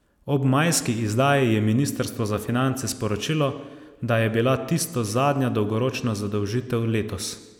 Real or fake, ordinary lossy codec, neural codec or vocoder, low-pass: real; none; none; 19.8 kHz